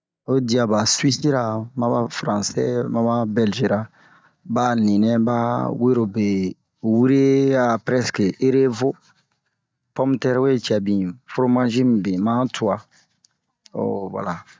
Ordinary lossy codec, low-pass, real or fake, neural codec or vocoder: none; none; real; none